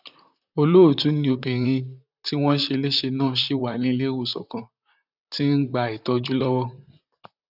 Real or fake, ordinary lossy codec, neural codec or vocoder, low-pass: fake; none; vocoder, 44.1 kHz, 128 mel bands, Pupu-Vocoder; 5.4 kHz